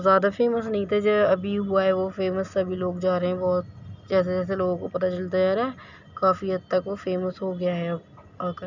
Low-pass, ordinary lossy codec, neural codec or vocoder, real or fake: 7.2 kHz; none; none; real